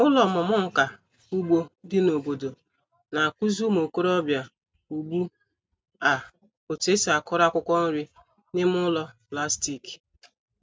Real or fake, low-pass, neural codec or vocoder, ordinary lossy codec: real; none; none; none